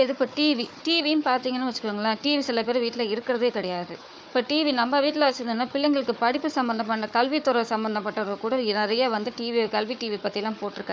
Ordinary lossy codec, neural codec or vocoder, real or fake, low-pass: none; codec, 16 kHz, 4 kbps, FunCodec, trained on Chinese and English, 50 frames a second; fake; none